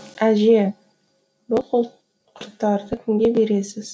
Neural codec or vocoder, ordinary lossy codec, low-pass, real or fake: none; none; none; real